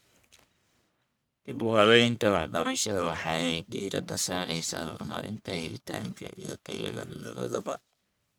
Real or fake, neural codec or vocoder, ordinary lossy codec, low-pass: fake; codec, 44.1 kHz, 1.7 kbps, Pupu-Codec; none; none